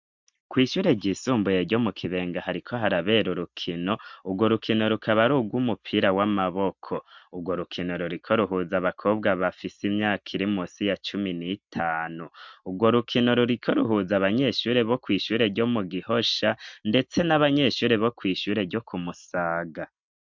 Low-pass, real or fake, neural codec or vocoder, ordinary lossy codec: 7.2 kHz; real; none; MP3, 64 kbps